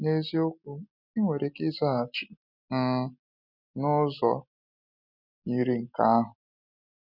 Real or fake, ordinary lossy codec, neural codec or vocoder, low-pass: real; none; none; 5.4 kHz